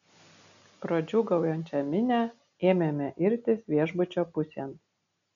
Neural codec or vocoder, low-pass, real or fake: none; 7.2 kHz; real